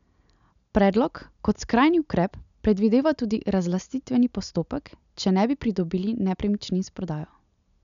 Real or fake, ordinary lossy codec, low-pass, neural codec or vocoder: real; none; 7.2 kHz; none